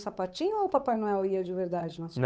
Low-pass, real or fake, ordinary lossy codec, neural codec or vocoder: none; fake; none; codec, 16 kHz, 8 kbps, FunCodec, trained on Chinese and English, 25 frames a second